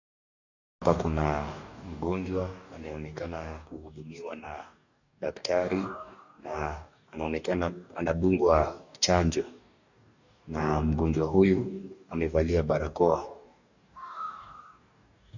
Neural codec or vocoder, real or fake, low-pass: codec, 44.1 kHz, 2.6 kbps, DAC; fake; 7.2 kHz